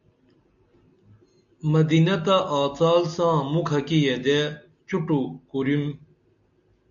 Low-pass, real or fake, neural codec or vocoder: 7.2 kHz; real; none